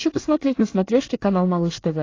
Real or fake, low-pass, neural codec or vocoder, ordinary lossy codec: fake; 7.2 kHz; codec, 24 kHz, 1 kbps, SNAC; AAC, 32 kbps